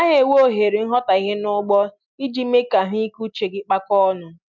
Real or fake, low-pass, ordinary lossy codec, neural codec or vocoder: real; 7.2 kHz; MP3, 64 kbps; none